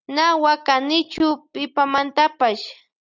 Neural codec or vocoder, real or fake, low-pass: none; real; 7.2 kHz